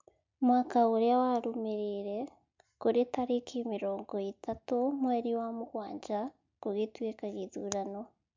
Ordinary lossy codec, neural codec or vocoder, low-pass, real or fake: MP3, 64 kbps; none; 7.2 kHz; real